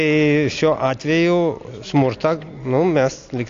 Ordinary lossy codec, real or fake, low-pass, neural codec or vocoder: AAC, 48 kbps; real; 7.2 kHz; none